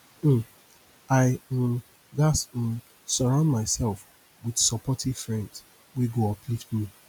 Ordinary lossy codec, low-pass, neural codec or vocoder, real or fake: none; 19.8 kHz; none; real